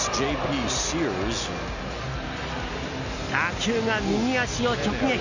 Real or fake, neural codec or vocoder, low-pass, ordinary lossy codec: real; none; 7.2 kHz; none